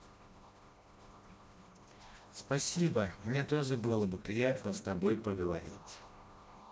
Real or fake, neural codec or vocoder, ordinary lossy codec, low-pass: fake; codec, 16 kHz, 1 kbps, FreqCodec, smaller model; none; none